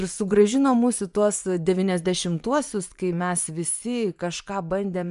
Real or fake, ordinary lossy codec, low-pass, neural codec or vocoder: fake; MP3, 96 kbps; 10.8 kHz; vocoder, 24 kHz, 100 mel bands, Vocos